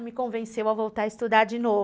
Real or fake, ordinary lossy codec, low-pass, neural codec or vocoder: real; none; none; none